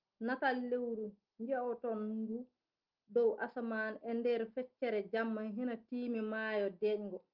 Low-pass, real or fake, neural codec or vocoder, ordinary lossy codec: 5.4 kHz; real; none; Opus, 32 kbps